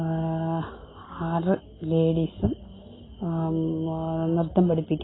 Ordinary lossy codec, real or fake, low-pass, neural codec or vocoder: AAC, 16 kbps; real; 7.2 kHz; none